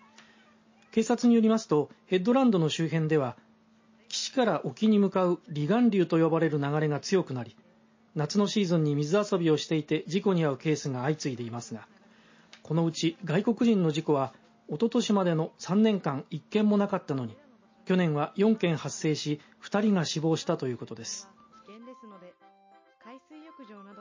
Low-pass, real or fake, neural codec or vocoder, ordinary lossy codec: 7.2 kHz; real; none; MP3, 32 kbps